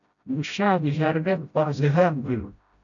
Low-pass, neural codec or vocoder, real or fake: 7.2 kHz; codec, 16 kHz, 0.5 kbps, FreqCodec, smaller model; fake